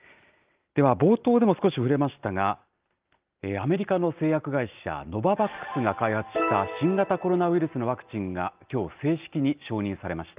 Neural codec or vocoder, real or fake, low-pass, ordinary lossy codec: none; real; 3.6 kHz; Opus, 24 kbps